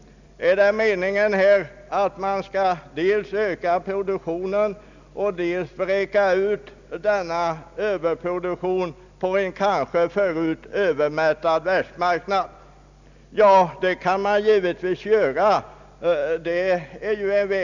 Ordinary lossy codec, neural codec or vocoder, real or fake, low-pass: none; none; real; 7.2 kHz